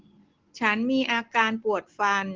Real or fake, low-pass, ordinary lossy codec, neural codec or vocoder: real; 7.2 kHz; Opus, 16 kbps; none